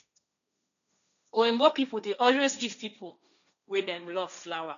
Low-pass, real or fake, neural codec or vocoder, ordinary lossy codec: 7.2 kHz; fake; codec, 16 kHz, 1.1 kbps, Voila-Tokenizer; none